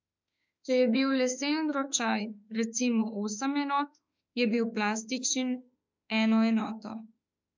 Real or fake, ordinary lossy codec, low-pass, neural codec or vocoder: fake; MP3, 64 kbps; 7.2 kHz; autoencoder, 48 kHz, 32 numbers a frame, DAC-VAE, trained on Japanese speech